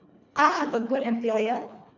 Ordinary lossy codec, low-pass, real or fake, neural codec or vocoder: none; 7.2 kHz; fake; codec, 24 kHz, 1.5 kbps, HILCodec